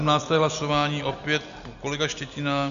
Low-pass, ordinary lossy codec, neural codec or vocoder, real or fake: 7.2 kHz; AAC, 96 kbps; none; real